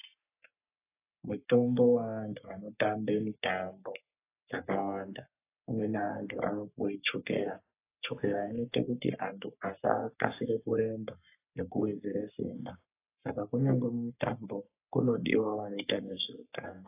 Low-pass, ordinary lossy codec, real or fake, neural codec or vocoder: 3.6 kHz; AAC, 24 kbps; fake; codec, 44.1 kHz, 3.4 kbps, Pupu-Codec